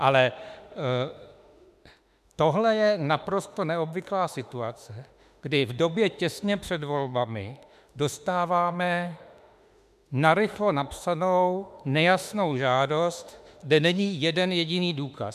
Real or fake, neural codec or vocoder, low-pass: fake; autoencoder, 48 kHz, 32 numbers a frame, DAC-VAE, trained on Japanese speech; 14.4 kHz